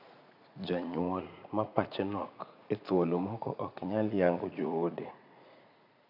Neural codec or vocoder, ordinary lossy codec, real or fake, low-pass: vocoder, 44.1 kHz, 80 mel bands, Vocos; none; fake; 5.4 kHz